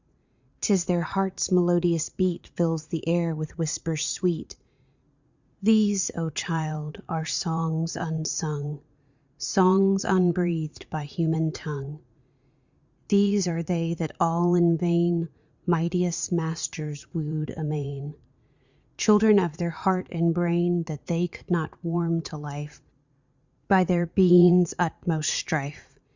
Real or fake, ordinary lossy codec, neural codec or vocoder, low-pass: fake; Opus, 64 kbps; vocoder, 44.1 kHz, 80 mel bands, Vocos; 7.2 kHz